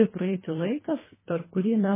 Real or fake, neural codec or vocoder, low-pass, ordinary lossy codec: fake; codec, 24 kHz, 1.5 kbps, HILCodec; 3.6 kHz; MP3, 16 kbps